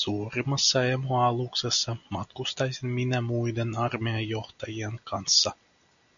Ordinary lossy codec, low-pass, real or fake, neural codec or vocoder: MP3, 96 kbps; 7.2 kHz; real; none